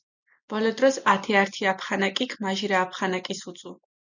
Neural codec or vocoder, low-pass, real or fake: none; 7.2 kHz; real